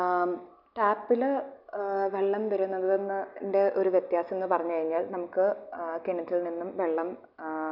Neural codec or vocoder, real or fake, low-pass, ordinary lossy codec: none; real; 5.4 kHz; none